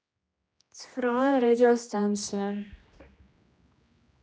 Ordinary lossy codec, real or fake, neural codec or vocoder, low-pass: none; fake; codec, 16 kHz, 1 kbps, X-Codec, HuBERT features, trained on general audio; none